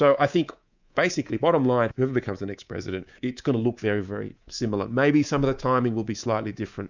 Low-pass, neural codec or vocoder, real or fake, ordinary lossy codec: 7.2 kHz; codec, 24 kHz, 3.1 kbps, DualCodec; fake; Opus, 64 kbps